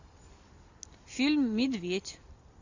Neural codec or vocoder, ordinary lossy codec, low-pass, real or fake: none; AAC, 48 kbps; 7.2 kHz; real